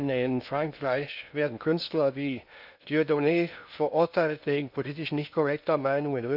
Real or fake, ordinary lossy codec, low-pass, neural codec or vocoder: fake; none; 5.4 kHz; codec, 16 kHz in and 24 kHz out, 0.6 kbps, FocalCodec, streaming, 2048 codes